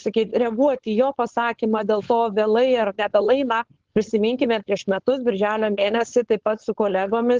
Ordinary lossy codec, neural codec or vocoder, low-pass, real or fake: Opus, 16 kbps; codec, 16 kHz, 4.8 kbps, FACodec; 7.2 kHz; fake